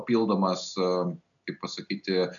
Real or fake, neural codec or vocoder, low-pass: real; none; 7.2 kHz